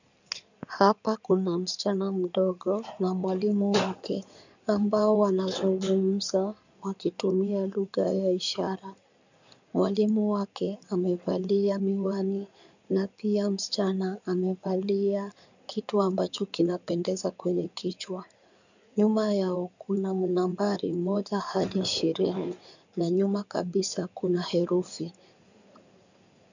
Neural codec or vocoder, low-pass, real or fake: codec, 16 kHz in and 24 kHz out, 2.2 kbps, FireRedTTS-2 codec; 7.2 kHz; fake